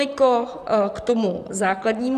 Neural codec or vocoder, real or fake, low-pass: vocoder, 44.1 kHz, 128 mel bands, Pupu-Vocoder; fake; 14.4 kHz